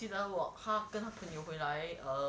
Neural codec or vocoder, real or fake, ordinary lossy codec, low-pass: none; real; none; none